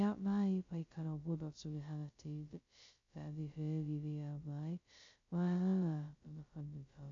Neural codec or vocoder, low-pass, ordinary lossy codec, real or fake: codec, 16 kHz, 0.2 kbps, FocalCodec; 7.2 kHz; MP3, 48 kbps; fake